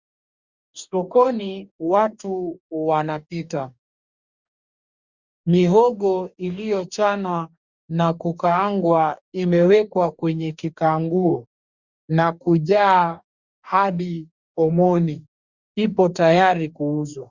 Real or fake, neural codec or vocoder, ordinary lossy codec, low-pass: fake; codec, 44.1 kHz, 2.6 kbps, DAC; Opus, 64 kbps; 7.2 kHz